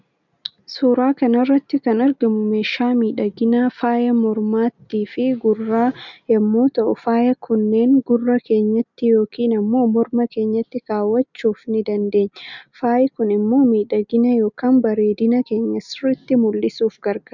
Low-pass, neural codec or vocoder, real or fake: 7.2 kHz; none; real